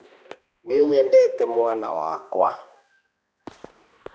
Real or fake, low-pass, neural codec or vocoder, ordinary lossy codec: fake; none; codec, 16 kHz, 1 kbps, X-Codec, HuBERT features, trained on balanced general audio; none